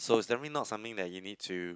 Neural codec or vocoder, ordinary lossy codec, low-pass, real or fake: none; none; none; real